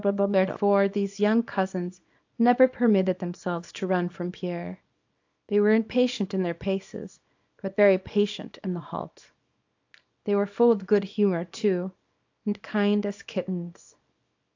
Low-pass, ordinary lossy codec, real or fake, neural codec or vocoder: 7.2 kHz; AAC, 48 kbps; fake; codec, 24 kHz, 0.9 kbps, WavTokenizer, small release